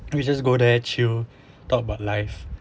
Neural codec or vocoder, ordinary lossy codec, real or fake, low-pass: none; none; real; none